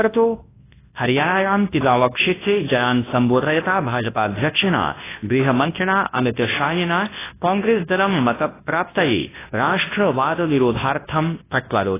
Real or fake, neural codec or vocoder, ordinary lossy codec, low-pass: fake; codec, 24 kHz, 0.9 kbps, WavTokenizer, large speech release; AAC, 16 kbps; 3.6 kHz